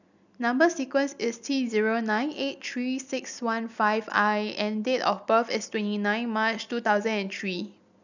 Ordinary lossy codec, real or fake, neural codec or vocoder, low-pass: none; real; none; 7.2 kHz